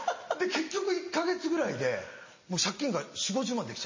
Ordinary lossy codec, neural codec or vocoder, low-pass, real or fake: MP3, 32 kbps; none; 7.2 kHz; real